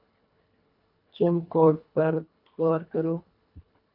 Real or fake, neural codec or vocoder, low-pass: fake; codec, 24 kHz, 1.5 kbps, HILCodec; 5.4 kHz